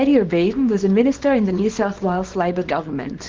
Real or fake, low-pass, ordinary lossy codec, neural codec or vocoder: fake; 7.2 kHz; Opus, 16 kbps; codec, 24 kHz, 0.9 kbps, WavTokenizer, small release